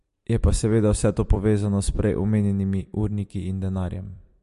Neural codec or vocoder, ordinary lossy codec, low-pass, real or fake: vocoder, 44.1 kHz, 128 mel bands every 256 samples, BigVGAN v2; MP3, 48 kbps; 14.4 kHz; fake